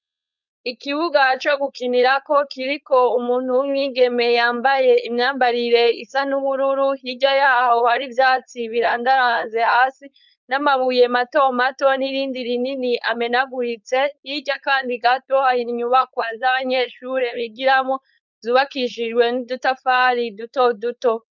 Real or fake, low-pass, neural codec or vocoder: fake; 7.2 kHz; codec, 16 kHz, 4.8 kbps, FACodec